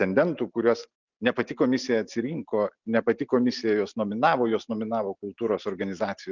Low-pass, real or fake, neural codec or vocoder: 7.2 kHz; real; none